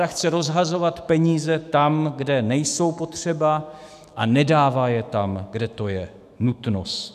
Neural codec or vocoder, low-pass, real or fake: autoencoder, 48 kHz, 128 numbers a frame, DAC-VAE, trained on Japanese speech; 14.4 kHz; fake